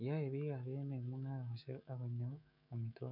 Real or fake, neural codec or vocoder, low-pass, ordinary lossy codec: fake; autoencoder, 48 kHz, 128 numbers a frame, DAC-VAE, trained on Japanese speech; 5.4 kHz; none